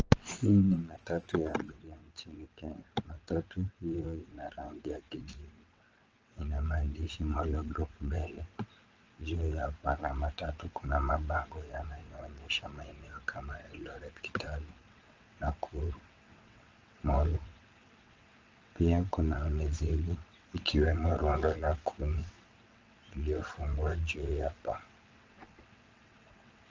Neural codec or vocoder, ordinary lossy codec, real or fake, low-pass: vocoder, 22.05 kHz, 80 mel bands, WaveNeXt; Opus, 24 kbps; fake; 7.2 kHz